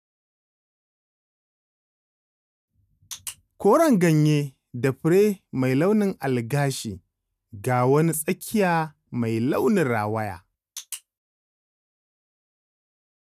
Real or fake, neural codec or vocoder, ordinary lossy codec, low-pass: real; none; none; 14.4 kHz